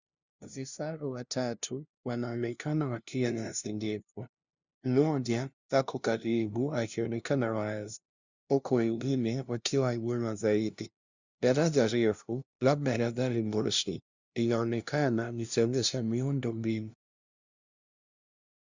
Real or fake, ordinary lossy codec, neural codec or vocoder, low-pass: fake; Opus, 64 kbps; codec, 16 kHz, 0.5 kbps, FunCodec, trained on LibriTTS, 25 frames a second; 7.2 kHz